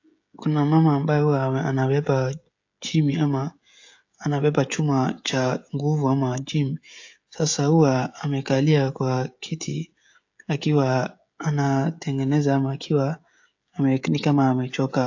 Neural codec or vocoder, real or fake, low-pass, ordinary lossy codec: codec, 16 kHz, 16 kbps, FreqCodec, smaller model; fake; 7.2 kHz; AAC, 48 kbps